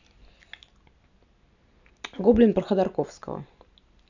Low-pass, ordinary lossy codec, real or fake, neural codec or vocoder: 7.2 kHz; none; real; none